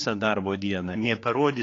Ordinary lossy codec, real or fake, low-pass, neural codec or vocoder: AAC, 32 kbps; fake; 7.2 kHz; codec, 16 kHz, 4 kbps, X-Codec, HuBERT features, trained on general audio